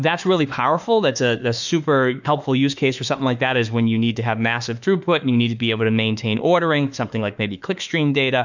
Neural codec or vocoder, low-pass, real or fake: autoencoder, 48 kHz, 32 numbers a frame, DAC-VAE, trained on Japanese speech; 7.2 kHz; fake